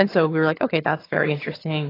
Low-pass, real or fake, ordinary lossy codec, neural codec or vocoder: 5.4 kHz; fake; AAC, 24 kbps; vocoder, 22.05 kHz, 80 mel bands, HiFi-GAN